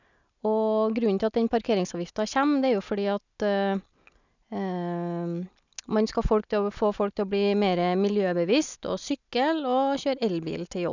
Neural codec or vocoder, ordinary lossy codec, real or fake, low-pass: none; none; real; 7.2 kHz